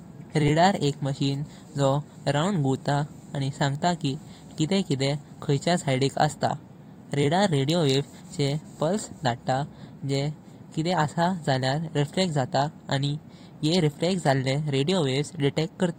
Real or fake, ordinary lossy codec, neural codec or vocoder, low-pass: fake; AAC, 48 kbps; vocoder, 44.1 kHz, 128 mel bands every 256 samples, BigVGAN v2; 19.8 kHz